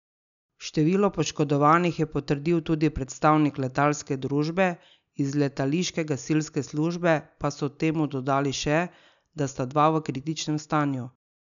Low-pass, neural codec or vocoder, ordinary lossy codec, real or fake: 7.2 kHz; none; none; real